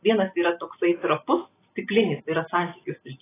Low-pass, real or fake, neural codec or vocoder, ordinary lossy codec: 3.6 kHz; real; none; AAC, 16 kbps